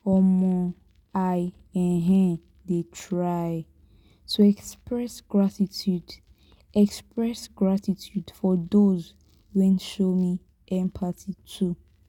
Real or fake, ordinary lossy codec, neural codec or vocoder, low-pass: real; none; none; 19.8 kHz